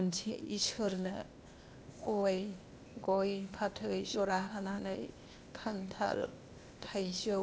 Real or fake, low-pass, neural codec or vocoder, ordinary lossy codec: fake; none; codec, 16 kHz, 0.8 kbps, ZipCodec; none